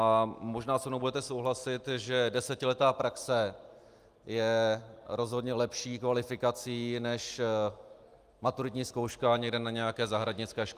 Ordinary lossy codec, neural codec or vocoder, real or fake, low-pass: Opus, 32 kbps; none; real; 14.4 kHz